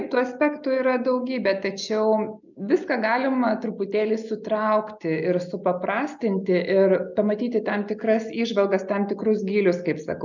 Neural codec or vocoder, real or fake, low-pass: none; real; 7.2 kHz